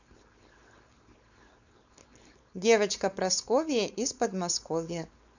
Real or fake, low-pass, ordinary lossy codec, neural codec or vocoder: fake; 7.2 kHz; none; codec, 16 kHz, 4.8 kbps, FACodec